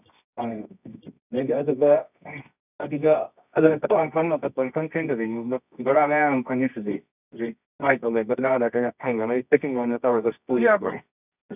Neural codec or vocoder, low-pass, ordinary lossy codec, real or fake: codec, 24 kHz, 0.9 kbps, WavTokenizer, medium music audio release; 3.6 kHz; none; fake